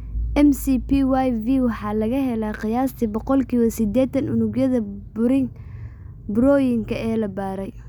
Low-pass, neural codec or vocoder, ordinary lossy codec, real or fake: 19.8 kHz; none; none; real